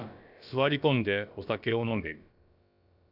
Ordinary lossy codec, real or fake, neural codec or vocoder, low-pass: none; fake; codec, 16 kHz, about 1 kbps, DyCAST, with the encoder's durations; 5.4 kHz